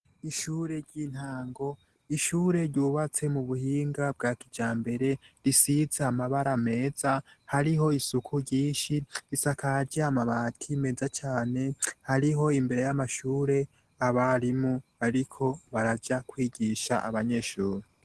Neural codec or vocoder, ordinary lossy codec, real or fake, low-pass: none; Opus, 16 kbps; real; 10.8 kHz